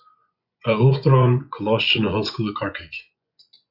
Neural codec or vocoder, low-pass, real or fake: vocoder, 24 kHz, 100 mel bands, Vocos; 5.4 kHz; fake